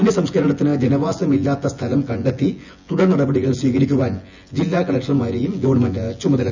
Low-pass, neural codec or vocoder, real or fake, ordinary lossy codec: 7.2 kHz; vocoder, 24 kHz, 100 mel bands, Vocos; fake; none